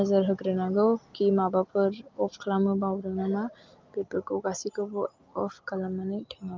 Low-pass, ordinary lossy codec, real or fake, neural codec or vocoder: 7.2 kHz; Opus, 24 kbps; real; none